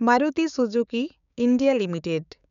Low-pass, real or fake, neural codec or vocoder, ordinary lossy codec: 7.2 kHz; fake; codec, 16 kHz, 6 kbps, DAC; none